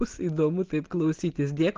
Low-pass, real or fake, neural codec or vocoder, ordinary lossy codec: 7.2 kHz; real; none; Opus, 16 kbps